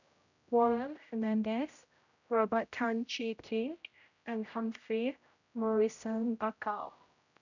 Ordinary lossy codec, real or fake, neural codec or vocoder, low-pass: none; fake; codec, 16 kHz, 0.5 kbps, X-Codec, HuBERT features, trained on general audio; 7.2 kHz